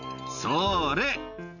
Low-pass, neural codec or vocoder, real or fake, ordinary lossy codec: 7.2 kHz; none; real; none